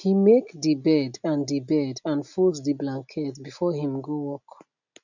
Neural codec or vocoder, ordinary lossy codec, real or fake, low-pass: none; none; real; 7.2 kHz